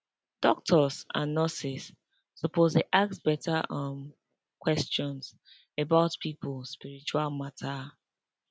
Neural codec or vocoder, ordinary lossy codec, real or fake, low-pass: none; none; real; none